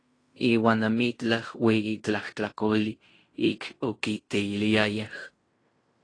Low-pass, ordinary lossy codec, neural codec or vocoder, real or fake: 9.9 kHz; AAC, 32 kbps; codec, 16 kHz in and 24 kHz out, 0.9 kbps, LongCat-Audio-Codec, fine tuned four codebook decoder; fake